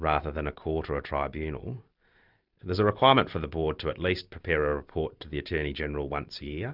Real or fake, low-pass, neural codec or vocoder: real; 5.4 kHz; none